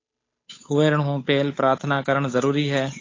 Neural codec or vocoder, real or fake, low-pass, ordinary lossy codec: codec, 16 kHz, 8 kbps, FunCodec, trained on Chinese and English, 25 frames a second; fake; 7.2 kHz; AAC, 32 kbps